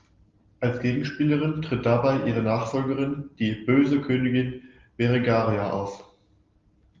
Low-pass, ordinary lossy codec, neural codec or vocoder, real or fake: 7.2 kHz; Opus, 32 kbps; none; real